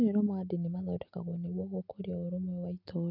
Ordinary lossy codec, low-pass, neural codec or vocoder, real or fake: none; 5.4 kHz; none; real